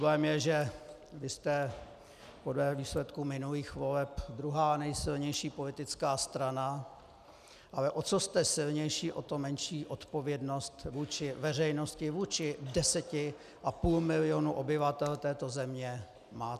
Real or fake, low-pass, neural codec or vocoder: real; 14.4 kHz; none